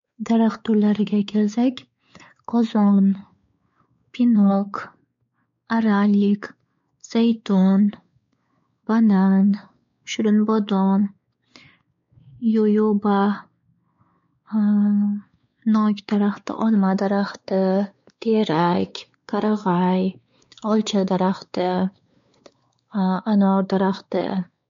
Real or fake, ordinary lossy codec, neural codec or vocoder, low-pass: fake; MP3, 48 kbps; codec, 16 kHz, 4 kbps, X-Codec, WavLM features, trained on Multilingual LibriSpeech; 7.2 kHz